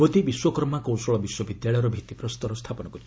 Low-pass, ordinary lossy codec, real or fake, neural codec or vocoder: none; none; real; none